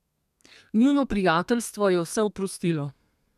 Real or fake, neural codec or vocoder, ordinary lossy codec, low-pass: fake; codec, 32 kHz, 1.9 kbps, SNAC; none; 14.4 kHz